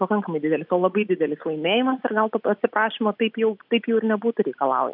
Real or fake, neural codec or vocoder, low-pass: real; none; 5.4 kHz